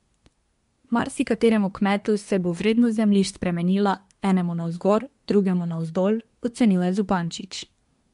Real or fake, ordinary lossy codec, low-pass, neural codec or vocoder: fake; MP3, 64 kbps; 10.8 kHz; codec, 24 kHz, 1 kbps, SNAC